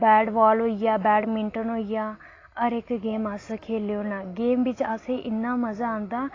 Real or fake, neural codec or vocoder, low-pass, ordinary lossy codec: real; none; 7.2 kHz; AAC, 32 kbps